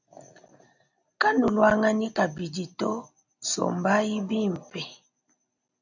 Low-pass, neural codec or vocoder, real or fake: 7.2 kHz; none; real